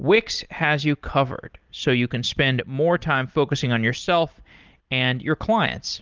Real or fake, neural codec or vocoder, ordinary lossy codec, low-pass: real; none; Opus, 32 kbps; 7.2 kHz